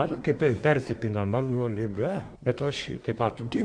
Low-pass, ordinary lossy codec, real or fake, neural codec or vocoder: 9.9 kHz; Opus, 64 kbps; fake; codec, 24 kHz, 1 kbps, SNAC